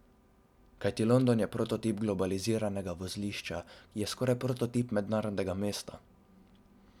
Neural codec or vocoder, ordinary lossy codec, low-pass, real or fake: none; none; 19.8 kHz; real